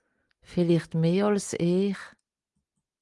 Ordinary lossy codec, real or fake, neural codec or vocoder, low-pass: Opus, 32 kbps; real; none; 10.8 kHz